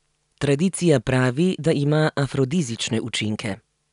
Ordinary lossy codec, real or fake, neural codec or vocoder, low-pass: none; real; none; 10.8 kHz